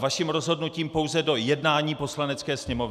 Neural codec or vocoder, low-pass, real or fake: none; 14.4 kHz; real